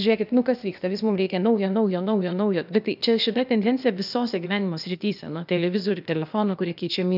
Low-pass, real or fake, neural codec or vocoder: 5.4 kHz; fake; codec, 16 kHz, 0.8 kbps, ZipCodec